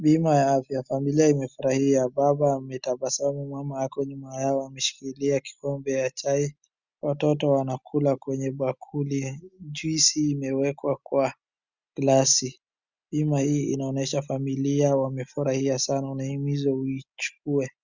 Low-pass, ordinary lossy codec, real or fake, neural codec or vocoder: 7.2 kHz; Opus, 64 kbps; real; none